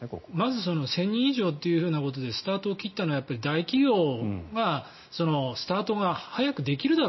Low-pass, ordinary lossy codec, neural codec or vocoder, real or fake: 7.2 kHz; MP3, 24 kbps; none; real